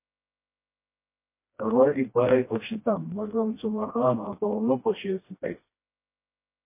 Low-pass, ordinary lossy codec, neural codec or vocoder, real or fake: 3.6 kHz; MP3, 24 kbps; codec, 16 kHz, 1 kbps, FreqCodec, smaller model; fake